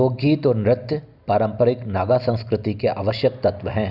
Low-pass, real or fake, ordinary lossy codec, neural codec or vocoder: 5.4 kHz; real; none; none